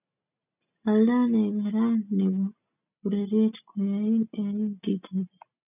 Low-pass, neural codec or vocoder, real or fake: 3.6 kHz; none; real